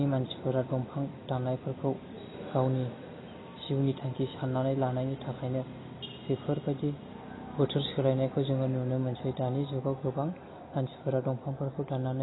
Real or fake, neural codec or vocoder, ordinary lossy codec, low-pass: real; none; AAC, 16 kbps; 7.2 kHz